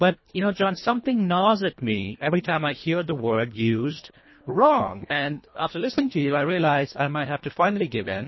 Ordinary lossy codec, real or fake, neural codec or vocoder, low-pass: MP3, 24 kbps; fake; codec, 24 kHz, 1.5 kbps, HILCodec; 7.2 kHz